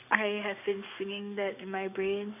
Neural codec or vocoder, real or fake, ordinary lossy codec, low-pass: codec, 44.1 kHz, 7.8 kbps, DAC; fake; none; 3.6 kHz